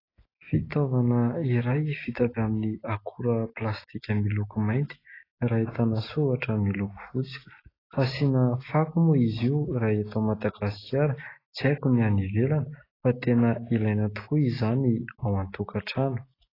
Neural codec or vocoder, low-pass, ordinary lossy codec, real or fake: none; 5.4 kHz; AAC, 24 kbps; real